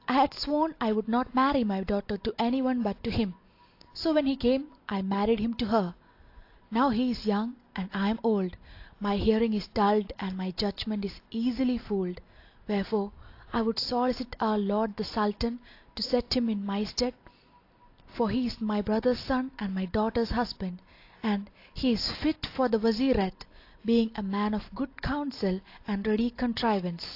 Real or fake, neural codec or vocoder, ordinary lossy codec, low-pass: real; none; AAC, 32 kbps; 5.4 kHz